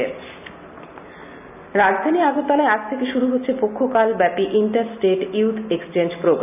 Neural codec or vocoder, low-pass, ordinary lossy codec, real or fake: none; 3.6 kHz; none; real